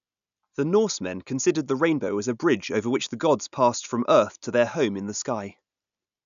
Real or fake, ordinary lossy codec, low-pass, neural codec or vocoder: real; none; 7.2 kHz; none